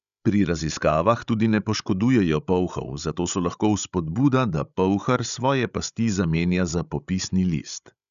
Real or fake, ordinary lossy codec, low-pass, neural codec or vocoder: fake; none; 7.2 kHz; codec, 16 kHz, 16 kbps, FreqCodec, larger model